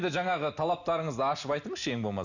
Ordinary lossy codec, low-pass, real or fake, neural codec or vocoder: none; 7.2 kHz; real; none